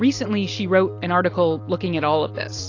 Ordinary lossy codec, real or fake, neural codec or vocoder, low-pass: AAC, 48 kbps; real; none; 7.2 kHz